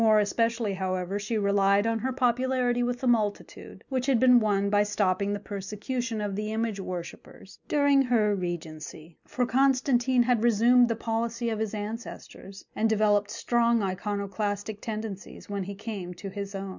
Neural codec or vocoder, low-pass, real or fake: none; 7.2 kHz; real